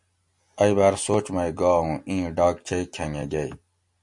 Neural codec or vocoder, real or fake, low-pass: none; real; 10.8 kHz